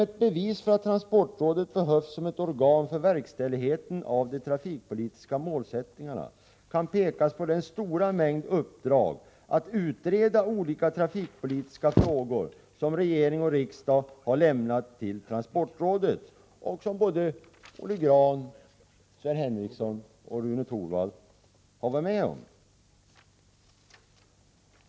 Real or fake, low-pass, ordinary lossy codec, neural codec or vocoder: real; none; none; none